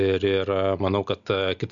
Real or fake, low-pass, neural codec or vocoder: real; 7.2 kHz; none